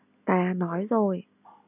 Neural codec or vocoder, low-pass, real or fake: none; 3.6 kHz; real